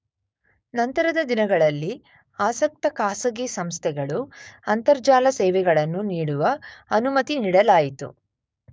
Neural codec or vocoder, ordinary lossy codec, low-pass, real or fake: codec, 16 kHz, 6 kbps, DAC; none; none; fake